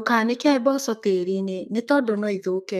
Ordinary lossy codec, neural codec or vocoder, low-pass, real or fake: none; codec, 32 kHz, 1.9 kbps, SNAC; 14.4 kHz; fake